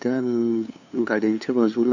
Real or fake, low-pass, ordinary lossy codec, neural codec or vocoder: fake; 7.2 kHz; none; codec, 16 kHz, 2 kbps, FunCodec, trained on LibriTTS, 25 frames a second